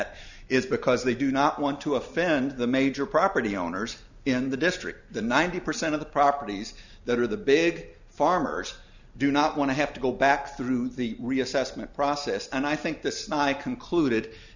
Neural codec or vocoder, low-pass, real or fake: none; 7.2 kHz; real